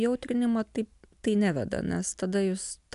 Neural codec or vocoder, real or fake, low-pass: none; real; 10.8 kHz